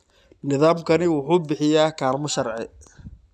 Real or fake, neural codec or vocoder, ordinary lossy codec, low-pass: real; none; none; none